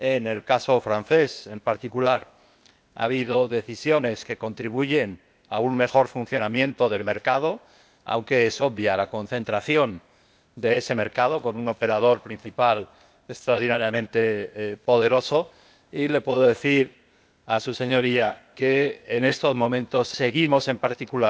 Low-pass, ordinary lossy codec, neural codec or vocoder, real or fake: none; none; codec, 16 kHz, 0.8 kbps, ZipCodec; fake